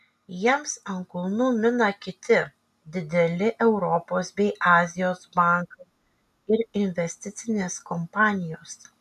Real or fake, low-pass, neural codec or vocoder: real; 14.4 kHz; none